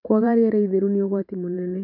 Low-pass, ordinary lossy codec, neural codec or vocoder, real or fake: 5.4 kHz; none; vocoder, 44.1 kHz, 128 mel bands every 512 samples, BigVGAN v2; fake